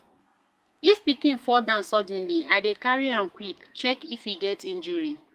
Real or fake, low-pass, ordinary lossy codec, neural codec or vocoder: fake; 14.4 kHz; Opus, 32 kbps; codec, 32 kHz, 1.9 kbps, SNAC